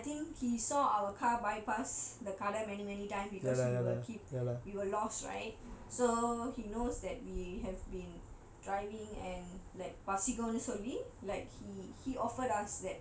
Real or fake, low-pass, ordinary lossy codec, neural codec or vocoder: real; none; none; none